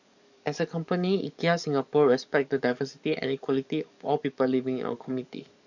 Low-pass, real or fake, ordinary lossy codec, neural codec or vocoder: 7.2 kHz; fake; none; codec, 44.1 kHz, 7.8 kbps, DAC